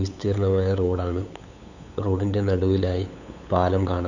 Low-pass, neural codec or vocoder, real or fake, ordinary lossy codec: 7.2 kHz; codec, 16 kHz, 8 kbps, FunCodec, trained on LibriTTS, 25 frames a second; fake; none